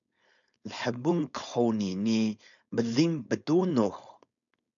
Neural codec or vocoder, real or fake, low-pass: codec, 16 kHz, 4.8 kbps, FACodec; fake; 7.2 kHz